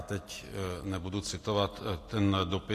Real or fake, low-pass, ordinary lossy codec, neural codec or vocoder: real; 14.4 kHz; AAC, 48 kbps; none